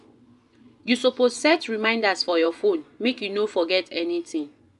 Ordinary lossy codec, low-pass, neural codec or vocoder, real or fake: none; 10.8 kHz; vocoder, 24 kHz, 100 mel bands, Vocos; fake